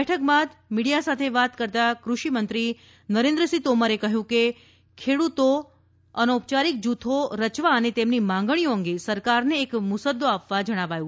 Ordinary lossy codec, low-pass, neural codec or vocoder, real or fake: none; none; none; real